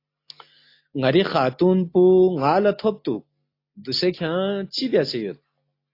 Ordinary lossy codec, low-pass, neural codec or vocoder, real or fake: AAC, 32 kbps; 5.4 kHz; none; real